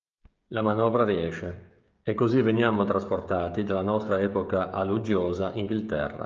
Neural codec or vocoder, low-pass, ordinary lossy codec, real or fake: codec, 16 kHz, 16 kbps, FreqCodec, smaller model; 7.2 kHz; Opus, 24 kbps; fake